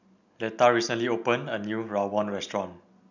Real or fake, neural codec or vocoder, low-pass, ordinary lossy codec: real; none; 7.2 kHz; none